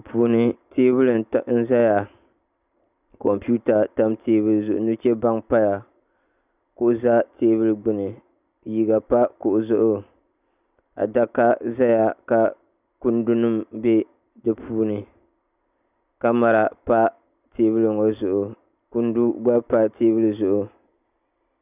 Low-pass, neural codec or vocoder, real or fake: 3.6 kHz; none; real